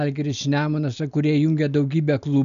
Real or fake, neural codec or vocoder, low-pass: real; none; 7.2 kHz